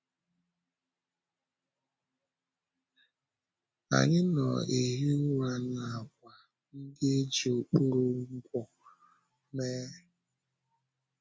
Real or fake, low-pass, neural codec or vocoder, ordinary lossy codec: real; none; none; none